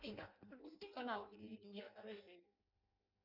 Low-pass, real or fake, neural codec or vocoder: 5.4 kHz; fake; codec, 16 kHz in and 24 kHz out, 0.6 kbps, FireRedTTS-2 codec